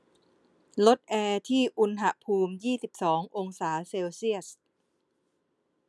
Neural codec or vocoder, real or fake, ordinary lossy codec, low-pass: none; real; none; none